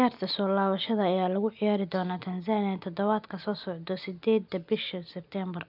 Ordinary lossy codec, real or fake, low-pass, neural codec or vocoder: none; real; 5.4 kHz; none